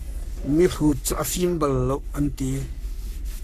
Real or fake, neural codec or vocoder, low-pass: fake; codec, 44.1 kHz, 3.4 kbps, Pupu-Codec; 14.4 kHz